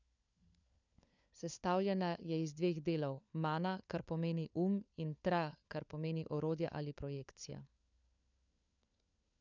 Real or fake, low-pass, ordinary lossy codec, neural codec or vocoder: fake; 7.2 kHz; none; codec, 16 kHz, 4 kbps, FunCodec, trained on LibriTTS, 50 frames a second